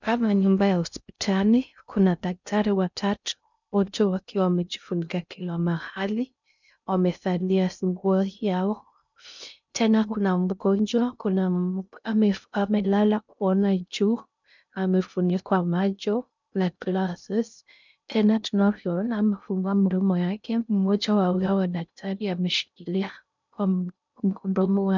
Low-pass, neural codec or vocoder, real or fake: 7.2 kHz; codec, 16 kHz in and 24 kHz out, 0.6 kbps, FocalCodec, streaming, 2048 codes; fake